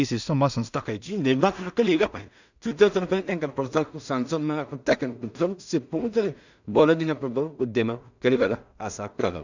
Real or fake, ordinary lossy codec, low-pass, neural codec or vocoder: fake; none; 7.2 kHz; codec, 16 kHz in and 24 kHz out, 0.4 kbps, LongCat-Audio-Codec, two codebook decoder